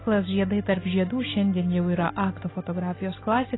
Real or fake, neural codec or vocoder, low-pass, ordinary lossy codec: real; none; 7.2 kHz; AAC, 16 kbps